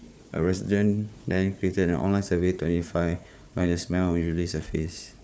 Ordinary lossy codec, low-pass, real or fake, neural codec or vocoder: none; none; fake; codec, 16 kHz, 4 kbps, FunCodec, trained on Chinese and English, 50 frames a second